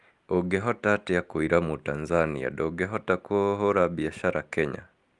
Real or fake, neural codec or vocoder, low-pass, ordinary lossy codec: real; none; 10.8 kHz; Opus, 32 kbps